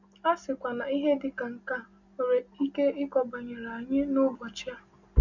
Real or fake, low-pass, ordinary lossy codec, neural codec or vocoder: real; 7.2 kHz; none; none